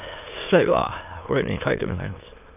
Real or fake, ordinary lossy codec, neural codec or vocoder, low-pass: fake; none; autoencoder, 22.05 kHz, a latent of 192 numbers a frame, VITS, trained on many speakers; 3.6 kHz